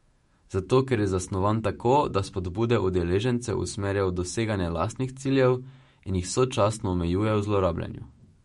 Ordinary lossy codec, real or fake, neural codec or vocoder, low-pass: MP3, 48 kbps; fake; autoencoder, 48 kHz, 128 numbers a frame, DAC-VAE, trained on Japanese speech; 19.8 kHz